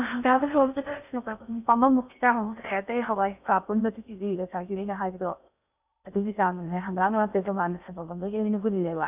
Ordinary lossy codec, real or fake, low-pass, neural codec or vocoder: none; fake; 3.6 kHz; codec, 16 kHz in and 24 kHz out, 0.6 kbps, FocalCodec, streaming, 4096 codes